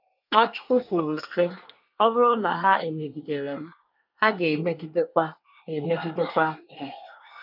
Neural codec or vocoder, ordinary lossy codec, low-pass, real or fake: codec, 24 kHz, 1 kbps, SNAC; none; 5.4 kHz; fake